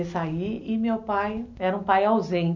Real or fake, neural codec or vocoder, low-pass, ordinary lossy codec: real; none; 7.2 kHz; none